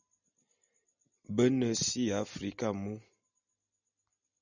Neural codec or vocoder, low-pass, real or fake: none; 7.2 kHz; real